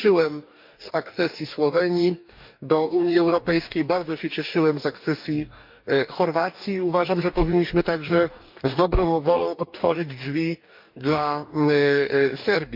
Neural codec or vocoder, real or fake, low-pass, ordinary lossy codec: codec, 44.1 kHz, 2.6 kbps, DAC; fake; 5.4 kHz; MP3, 48 kbps